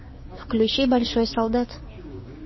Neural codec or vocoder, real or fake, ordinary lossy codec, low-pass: codec, 16 kHz, 6 kbps, DAC; fake; MP3, 24 kbps; 7.2 kHz